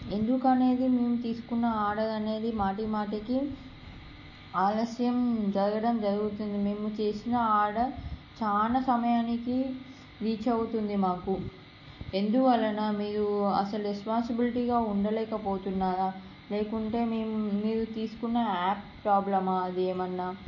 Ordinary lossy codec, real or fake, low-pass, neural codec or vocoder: MP3, 32 kbps; real; 7.2 kHz; none